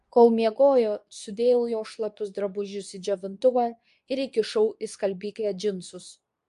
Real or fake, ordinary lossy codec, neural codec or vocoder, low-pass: fake; AAC, 96 kbps; codec, 24 kHz, 0.9 kbps, WavTokenizer, medium speech release version 2; 10.8 kHz